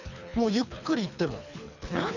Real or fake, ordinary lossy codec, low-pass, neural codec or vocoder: fake; none; 7.2 kHz; codec, 24 kHz, 3 kbps, HILCodec